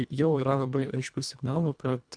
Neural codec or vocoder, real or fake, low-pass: codec, 24 kHz, 1.5 kbps, HILCodec; fake; 9.9 kHz